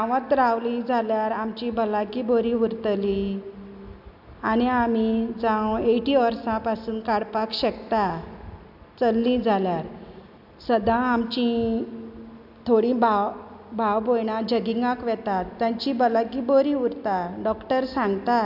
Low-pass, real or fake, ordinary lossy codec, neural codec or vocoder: 5.4 kHz; real; AAC, 48 kbps; none